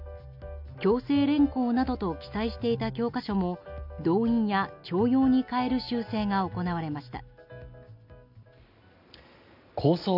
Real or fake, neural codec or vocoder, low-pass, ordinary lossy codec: real; none; 5.4 kHz; none